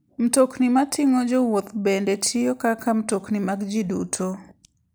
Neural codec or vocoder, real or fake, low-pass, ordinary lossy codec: none; real; none; none